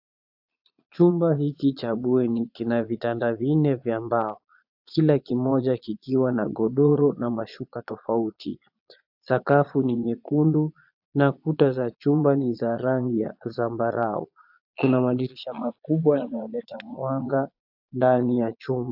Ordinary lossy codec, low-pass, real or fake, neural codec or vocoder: AAC, 48 kbps; 5.4 kHz; fake; vocoder, 22.05 kHz, 80 mel bands, Vocos